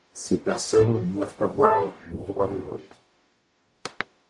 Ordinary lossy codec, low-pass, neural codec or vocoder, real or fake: AAC, 64 kbps; 10.8 kHz; codec, 44.1 kHz, 0.9 kbps, DAC; fake